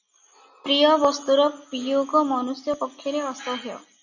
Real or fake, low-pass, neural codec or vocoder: real; 7.2 kHz; none